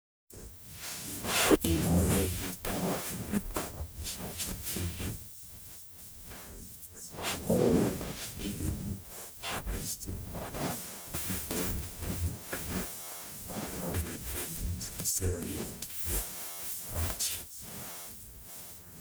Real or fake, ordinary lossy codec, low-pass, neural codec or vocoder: fake; none; none; codec, 44.1 kHz, 0.9 kbps, DAC